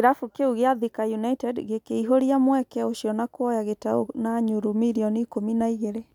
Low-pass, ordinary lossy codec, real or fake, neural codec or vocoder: 19.8 kHz; none; real; none